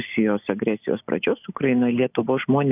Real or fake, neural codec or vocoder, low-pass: real; none; 3.6 kHz